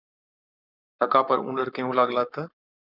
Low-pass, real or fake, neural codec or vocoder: 5.4 kHz; fake; codec, 44.1 kHz, 7.8 kbps, Pupu-Codec